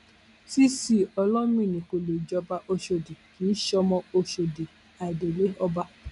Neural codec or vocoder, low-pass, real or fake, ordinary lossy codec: none; 10.8 kHz; real; none